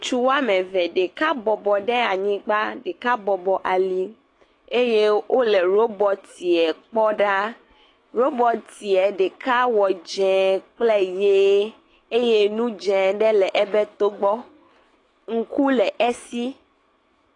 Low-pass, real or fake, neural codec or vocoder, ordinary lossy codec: 10.8 kHz; fake; autoencoder, 48 kHz, 128 numbers a frame, DAC-VAE, trained on Japanese speech; AAC, 32 kbps